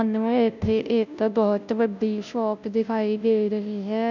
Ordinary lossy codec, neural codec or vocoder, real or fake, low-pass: none; codec, 16 kHz, 0.5 kbps, FunCodec, trained on Chinese and English, 25 frames a second; fake; 7.2 kHz